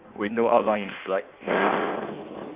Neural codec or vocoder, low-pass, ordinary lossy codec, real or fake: codec, 16 kHz in and 24 kHz out, 1.1 kbps, FireRedTTS-2 codec; 3.6 kHz; Opus, 64 kbps; fake